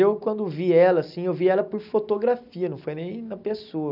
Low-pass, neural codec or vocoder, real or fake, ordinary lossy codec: 5.4 kHz; none; real; none